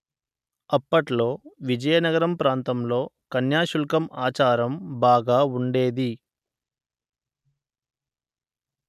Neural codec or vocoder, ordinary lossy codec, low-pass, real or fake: none; none; 14.4 kHz; real